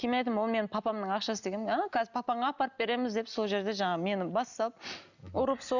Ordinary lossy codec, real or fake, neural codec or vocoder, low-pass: none; real; none; 7.2 kHz